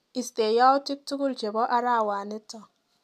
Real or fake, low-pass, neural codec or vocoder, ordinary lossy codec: real; 14.4 kHz; none; none